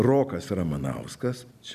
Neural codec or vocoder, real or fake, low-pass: none; real; 14.4 kHz